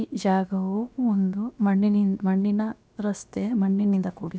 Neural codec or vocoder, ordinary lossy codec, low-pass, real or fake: codec, 16 kHz, about 1 kbps, DyCAST, with the encoder's durations; none; none; fake